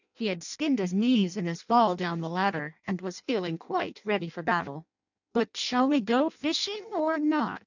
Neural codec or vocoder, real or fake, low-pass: codec, 16 kHz in and 24 kHz out, 0.6 kbps, FireRedTTS-2 codec; fake; 7.2 kHz